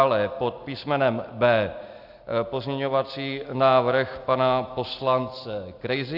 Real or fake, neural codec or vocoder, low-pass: real; none; 5.4 kHz